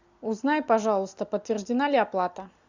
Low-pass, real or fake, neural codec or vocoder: 7.2 kHz; real; none